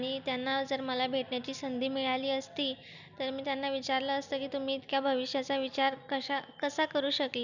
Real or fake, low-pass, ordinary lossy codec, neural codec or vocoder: real; 7.2 kHz; none; none